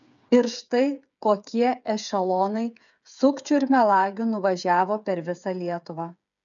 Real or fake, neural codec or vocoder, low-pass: fake; codec, 16 kHz, 8 kbps, FreqCodec, smaller model; 7.2 kHz